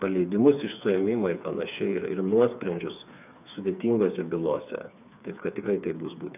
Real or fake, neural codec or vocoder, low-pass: fake; codec, 16 kHz, 4 kbps, FreqCodec, smaller model; 3.6 kHz